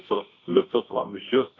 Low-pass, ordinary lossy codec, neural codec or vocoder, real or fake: 7.2 kHz; AAC, 32 kbps; codec, 24 kHz, 0.9 kbps, WavTokenizer, medium music audio release; fake